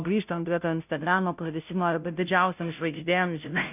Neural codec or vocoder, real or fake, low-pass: codec, 16 kHz, 0.5 kbps, FunCodec, trained on Chinese and English, 25 frames a second; fake; 3.6 kHz